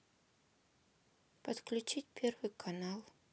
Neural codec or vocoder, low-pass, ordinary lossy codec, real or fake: none; none; none; real